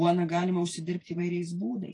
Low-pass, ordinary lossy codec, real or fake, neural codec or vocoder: 10.8 kHz; AAC, 32 kbps; fake; vocoder, 44.1 kHz, 128 mel bands every 512 samples, BigVGAN v2